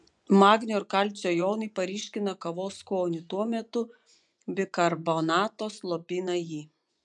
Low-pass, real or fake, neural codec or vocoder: 10.8 kHz; fake; vocoder, 24 kHz, 100 mel bands, Vocos